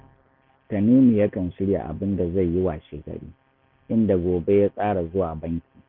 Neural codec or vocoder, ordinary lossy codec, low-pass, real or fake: none; none; 5.4 kHz; real